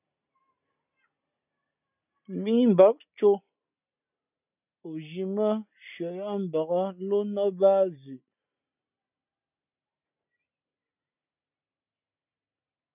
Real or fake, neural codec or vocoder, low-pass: real; none; 3.6 kHz